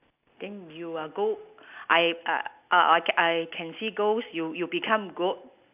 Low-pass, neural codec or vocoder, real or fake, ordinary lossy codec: 3.6 kHz; none; real; none